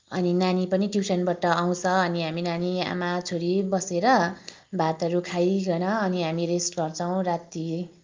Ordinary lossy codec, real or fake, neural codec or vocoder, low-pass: Opus, 32 kbps; real; none; 7.2 kHz